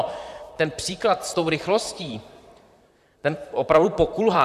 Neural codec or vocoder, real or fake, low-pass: vocoder, 44.1 kHz, 128 mel bands, Pupu-Vocoder; fake; 14.4 kHz